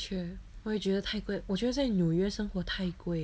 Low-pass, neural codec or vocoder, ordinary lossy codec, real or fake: none; none; none; real